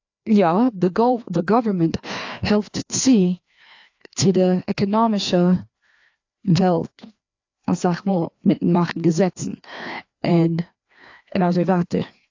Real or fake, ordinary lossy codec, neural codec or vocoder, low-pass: fake; AAC, 48 kbps; codec, 16 kHz, 2 kbps, FreqCodec, larger model; 7.2 kHz